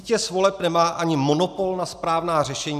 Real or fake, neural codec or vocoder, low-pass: real; none; 14.4 kHz